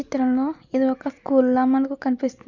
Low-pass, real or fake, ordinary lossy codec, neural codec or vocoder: 7.2 kHz; real; Opus, 64 kbps; none